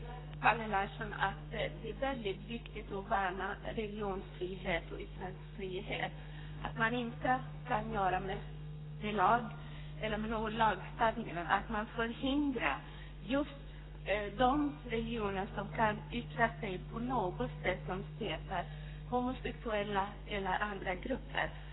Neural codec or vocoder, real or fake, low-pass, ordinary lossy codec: codec, 32 kHz, 1.9 kbps, SNAC; fake; 7.2 kHz; AAC, 16 kbps